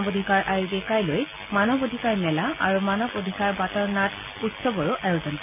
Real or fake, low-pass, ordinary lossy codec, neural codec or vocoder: real; 3.6 kHz; none; none